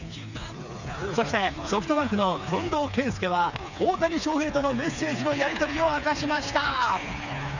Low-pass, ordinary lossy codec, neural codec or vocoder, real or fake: 7.2 kHz; none; codec, 16 kHz, 4 kbps, FreqCodec, smaller model; fake